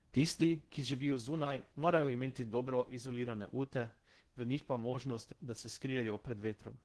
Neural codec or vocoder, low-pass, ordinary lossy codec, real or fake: codec, 16 kHz in and 24 kHz out, 0.6 kbps, FocalCodec, streaming, 4096 codes; 10.8 kHz; Opus, 16 kbps; fake